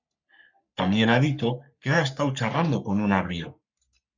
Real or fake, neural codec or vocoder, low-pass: fake; codec, 44.1 kHz, 3.4 kbps, Pupu-Codec; 7.2 kHz